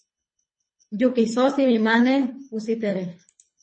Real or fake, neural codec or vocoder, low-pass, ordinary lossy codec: fake; codec, 24 kHz, 3 kbps, HILCodec; 9.9 kHz; MP3, 32 kbps